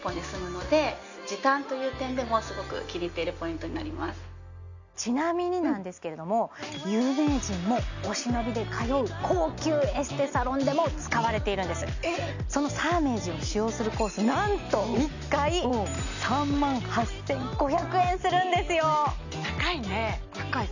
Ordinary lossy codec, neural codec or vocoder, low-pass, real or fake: none; none; 7.2 kHz; real